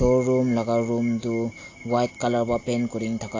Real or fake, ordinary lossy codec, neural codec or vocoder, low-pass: real; AAC, 32 kbps; none; 7.2 kHz